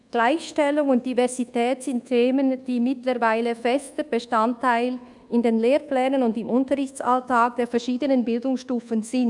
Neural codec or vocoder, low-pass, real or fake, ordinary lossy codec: codec, 24 kHz, 1.2 kbps, DualCodec; 10.8 kHz; fake; none